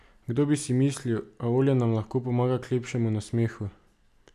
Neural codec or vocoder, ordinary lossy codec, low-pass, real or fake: none; none; 14.4 kHz; real